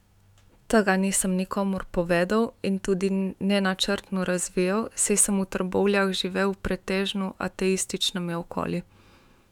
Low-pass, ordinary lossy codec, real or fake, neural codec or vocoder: 19.8 kHz; none; fake; autoencoder, 48 kHz, 128 numbers a frame, DAC-VAE, trained on Japanese speech